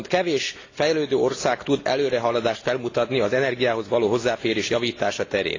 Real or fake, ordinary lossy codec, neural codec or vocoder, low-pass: real; AAC, 32 kbps; none; 7.2 kHz